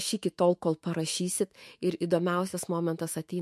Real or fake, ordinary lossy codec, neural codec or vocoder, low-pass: fake; MP3, 64 kbps; autoencoder, 48 kHz, 128 numbers a frame, DAC-VAE, trained on Japanese speech; 14.4 kHz